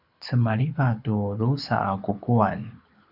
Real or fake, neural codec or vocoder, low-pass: fake; codec, 24 kHz, 6 kbps, HILCodec; 5.4 kHz